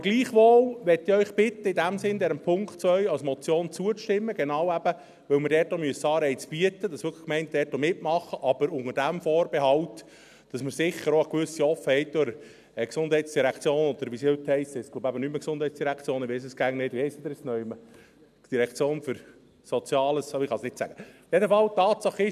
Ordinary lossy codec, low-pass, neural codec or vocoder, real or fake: none; 14.4 kHz; none; real